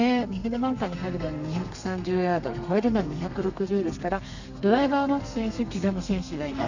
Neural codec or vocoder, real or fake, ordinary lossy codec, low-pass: codec, 32 kHz, 1.9 kbps, SNAC; fake; none; 7.2 kHz